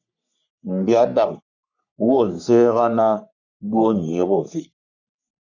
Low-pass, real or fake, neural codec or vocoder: 7.2 kHz; fake; codec, 44.1 kHz, 3.4 kbps, Pupu-Codec